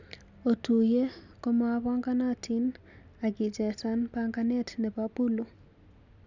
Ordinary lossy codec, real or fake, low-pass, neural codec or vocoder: none; real; 7.2 kHz; none